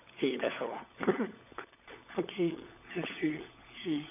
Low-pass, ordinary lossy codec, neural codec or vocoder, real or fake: 3.6 kHz; none; codec, 16 kHz, 16 kbps, FunCodec, trained on LibriTTS, 50 frames a second; fake